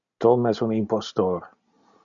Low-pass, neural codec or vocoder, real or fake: 7.2 kHz; none; real